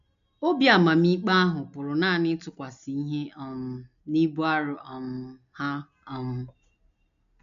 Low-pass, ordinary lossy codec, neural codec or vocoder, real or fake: 7.2 kHz; none; none; real